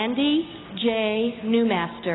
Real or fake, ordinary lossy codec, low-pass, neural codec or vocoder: real; AAC, 16 kbps; 7.2 kHz; none